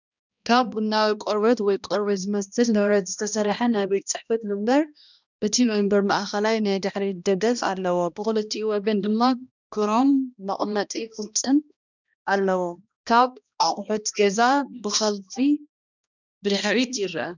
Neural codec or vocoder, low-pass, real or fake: codec, 16 kHz, 1 kbps, X-Codec, HuBERT features, trained on balanced general audio; 7.2 kHz; fake